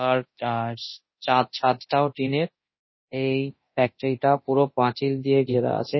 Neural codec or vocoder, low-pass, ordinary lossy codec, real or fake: codec, 24 kHz, 0.9 kbps, DualCodec; 7.2 kHz; MP3, 24 kbps; fake